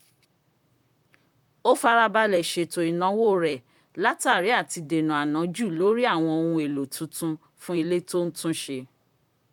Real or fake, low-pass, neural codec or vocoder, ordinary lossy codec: fake; 19.8 kHz; vocoder, 44.1 kHz, 128 mel bands, Pupu-Vocoder; none